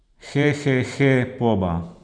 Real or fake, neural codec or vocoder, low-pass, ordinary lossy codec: fake; vocoder, 44.1 kHz, 128 mel bands every 512 samples, BigVGAN v2; 9.9 kHz; none